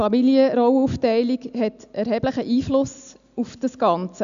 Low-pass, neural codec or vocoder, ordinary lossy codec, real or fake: 7.2 kHz; none; none; real